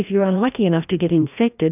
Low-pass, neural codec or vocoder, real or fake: 3.6 kHz; codec, 16 kHz, 1 kbps, FreqCodec, larger model; fake